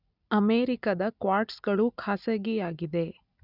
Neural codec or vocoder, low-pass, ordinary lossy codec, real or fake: none; 5.4 kHz; none; real